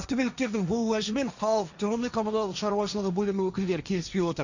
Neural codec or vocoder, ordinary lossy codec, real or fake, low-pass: codec, 16 kHz, 1.1 kbps, Voila-Tokenizer; none; fake; 7.2 kHz